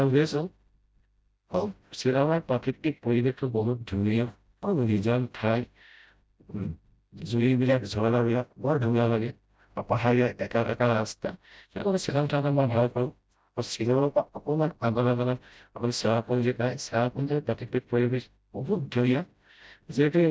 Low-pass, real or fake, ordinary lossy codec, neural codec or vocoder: none; fake; none; codec, 16 kHz, 0.5 kbps, FreqCodec, smaller model